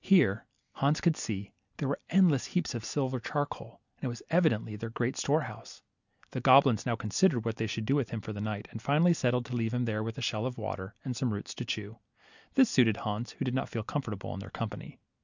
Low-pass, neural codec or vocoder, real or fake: 7.2 kHz; none; real